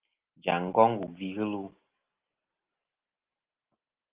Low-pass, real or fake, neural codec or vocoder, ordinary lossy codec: 3.6 kHz; real; none; Opus, 32 kbps